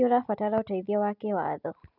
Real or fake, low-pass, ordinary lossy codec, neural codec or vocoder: real; 5.4 kHz; none; none